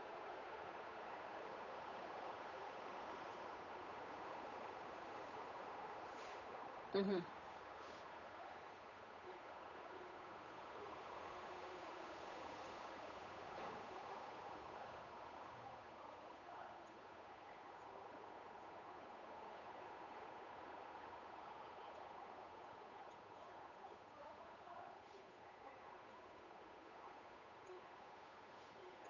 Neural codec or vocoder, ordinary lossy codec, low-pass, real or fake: codec, 16 kHz, 8 kbps, FunCodec, trained on Chinese and English, 25 frames a second; none; 7.2 kHz; fake